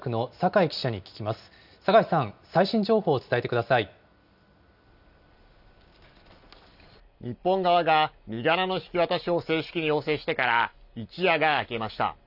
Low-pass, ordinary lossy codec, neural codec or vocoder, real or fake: 5.4 kHz; none; none; real